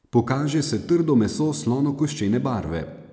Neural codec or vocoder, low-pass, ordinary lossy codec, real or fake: none; none; none; real